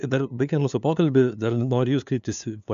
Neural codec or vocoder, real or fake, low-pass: codec, 16 kHz, 2 kbps, FunCodec, trained on LibriTTS, 25 frames a second; fake; 7.2 kHz